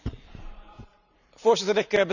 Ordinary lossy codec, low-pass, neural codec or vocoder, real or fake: none; 7.2 kHz; vocoder, 44.1 kHz, 128 mel bands every 512 samples, BigVGAN v2; fake